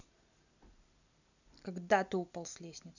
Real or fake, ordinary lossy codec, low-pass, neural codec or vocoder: real; none; 7.2 kHz; none